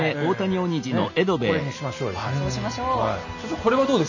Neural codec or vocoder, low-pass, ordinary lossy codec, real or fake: none; 7.2 kHz; none; real